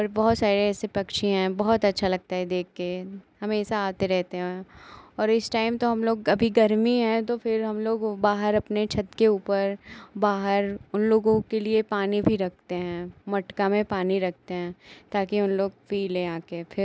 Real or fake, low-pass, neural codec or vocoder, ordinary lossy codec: real; none; none; none